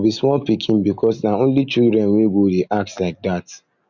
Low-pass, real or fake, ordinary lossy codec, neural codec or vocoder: 7.2 kHz; real; none; none